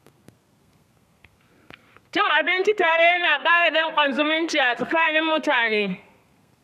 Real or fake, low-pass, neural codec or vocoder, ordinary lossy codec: fake; 14.4 kHz; codec, 32 kHz, 1.9 kbps, SNAC; none